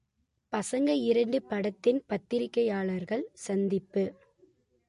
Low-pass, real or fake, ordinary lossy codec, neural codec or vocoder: 10.8 kHz; real; MP3, 48 kbps; none